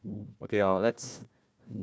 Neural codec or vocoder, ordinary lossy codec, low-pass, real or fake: codec, 16 kHz, 1 kbps, FunCodec, trained on Chinese and English, 50 frames a second; none; none; fake